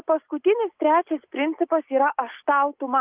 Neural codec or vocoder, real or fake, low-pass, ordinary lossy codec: none; real; 3.6 kHz; Opus, 32 kbps